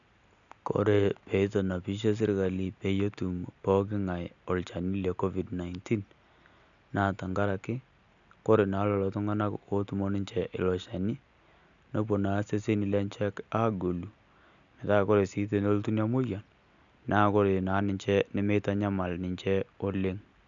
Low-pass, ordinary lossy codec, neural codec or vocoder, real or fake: 7.2 kHz; none; none; real